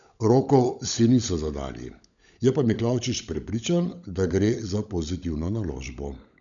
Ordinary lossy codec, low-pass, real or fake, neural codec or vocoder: none; 7.2 kHz; fake; codec, 16 kHz, 16 kbps, FunCodec, trained on LibriTTS, 50 frames a second